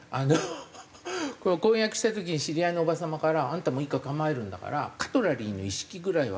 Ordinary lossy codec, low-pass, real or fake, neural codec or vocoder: none; none; real; none